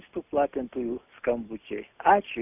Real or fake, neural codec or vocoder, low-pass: real; none; 3.6 kHz